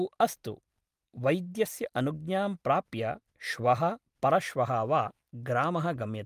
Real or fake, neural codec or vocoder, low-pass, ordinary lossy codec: real; none; 14.4 kHz; Opus, 32 kbps